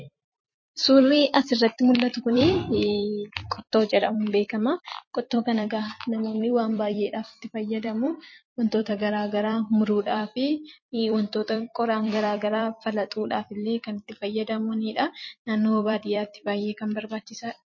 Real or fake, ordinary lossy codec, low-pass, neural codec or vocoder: fake; MP3, 32 kbps; 7.2 kHz; vocoder, 44.1 kHz, 128 mel bands every 256 samples, BigVGAN v2